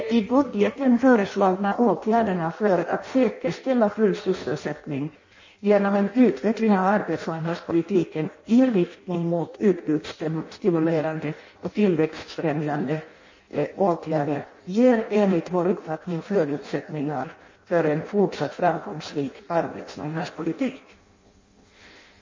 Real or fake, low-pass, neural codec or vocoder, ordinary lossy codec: fake; 7.2 kHz; codec, 16 kHz in and 24 kHz out, 0.6 kbps, FireRedTTS-2 codec; MP3, 32 kbps